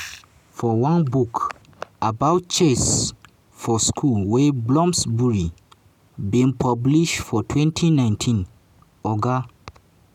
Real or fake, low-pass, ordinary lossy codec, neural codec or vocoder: real; 19.8 kHz; none; none